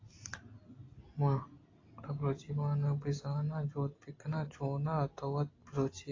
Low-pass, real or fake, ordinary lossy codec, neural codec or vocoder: 7.2 kHz; real; AAC, 32 kbps; none